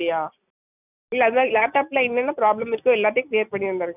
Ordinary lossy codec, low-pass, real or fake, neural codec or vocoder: none; 3.6 kHz; real; none